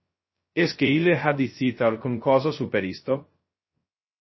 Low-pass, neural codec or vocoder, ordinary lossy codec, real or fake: 7.2 kHz; codec, 16 kHz, 0.2 kbps, FocalCodec; MP3, 24 kbps; fake